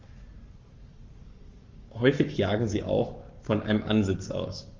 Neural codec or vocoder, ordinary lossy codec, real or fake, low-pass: codec, 44.1 kHz, 7.8 kbps, Pupu-Codec; Opus, 32 kbps; fake; 7.2 kHz